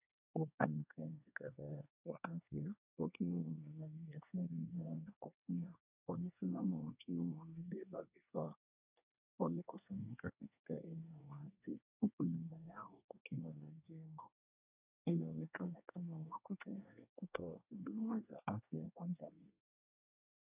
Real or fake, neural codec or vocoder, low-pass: fake; codec, 24 kHz, 1 kbps, SNAC; 3.6 kHz